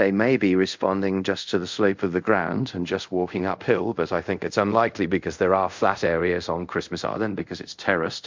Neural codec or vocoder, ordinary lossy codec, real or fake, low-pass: codec, 24 kHz, 0.5 kbps, DualCodec; MP3, 64 kbps; fake; 7.2 kHz